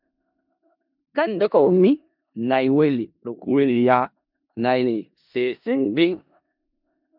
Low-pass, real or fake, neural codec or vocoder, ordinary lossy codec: 5.4 kHz; fake; codec, 16 kHz in and 24 kHz out, 0.4 kbps, LongCat-Audio-Codec, four codebook decoder; MP3, 48 kbps